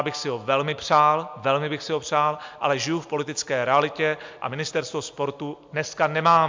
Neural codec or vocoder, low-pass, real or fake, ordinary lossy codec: none; 7.2 kHz; real; MP3, 64 kbps